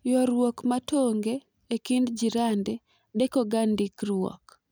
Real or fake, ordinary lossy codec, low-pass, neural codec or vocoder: real; none; none; none